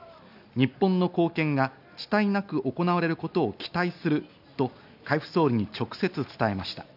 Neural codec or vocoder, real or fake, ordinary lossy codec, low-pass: none; real; none; 5.4 kHz